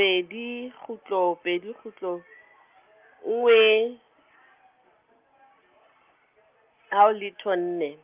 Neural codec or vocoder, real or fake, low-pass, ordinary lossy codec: none; real; 3.6 kHz; Opus, 24 kbps